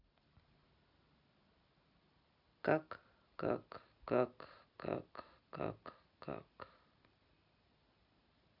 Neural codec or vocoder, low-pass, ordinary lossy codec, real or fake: none; 5.4 kHz; none; real